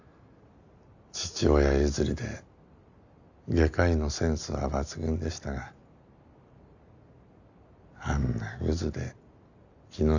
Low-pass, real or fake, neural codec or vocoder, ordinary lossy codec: 7.2 kHz; real; none; none